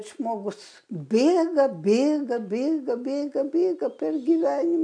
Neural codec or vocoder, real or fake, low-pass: none; real; 9.9 kHz